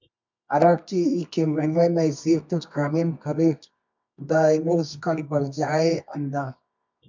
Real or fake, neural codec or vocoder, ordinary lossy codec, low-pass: fake; codec, 24 kHz, 0.9 kbps, WavTokenizer, medium music audio release; MP3, 64 kbps; 7.2 kHz